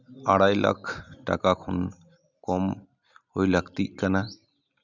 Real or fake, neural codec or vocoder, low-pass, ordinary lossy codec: real; none; 7.2 kHz; none